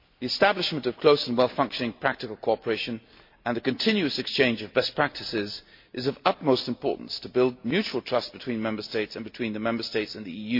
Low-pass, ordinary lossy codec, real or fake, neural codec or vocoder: 5.4 kHz; MP3, 32 kbps; real; none